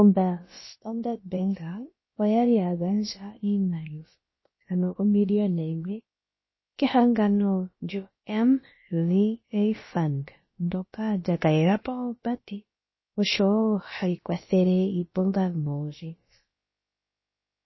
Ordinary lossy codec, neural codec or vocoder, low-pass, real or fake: MP3, 24 kbps; codec, 16 kHz, about 1 kbps, DyCAST, with the encoder's durations; 7.2 kHz; fake